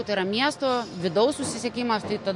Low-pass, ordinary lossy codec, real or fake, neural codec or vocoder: 10.8 kHz; MP3, 48 kbps; real; none